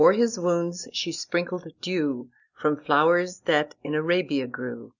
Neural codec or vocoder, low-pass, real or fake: none; 7.2 kHz; real